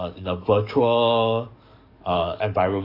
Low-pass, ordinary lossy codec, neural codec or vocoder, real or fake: 5.4 kHz; AAC, 32 kbps; codec, 44.1 kHz, 7.8 kbps, DAC; fake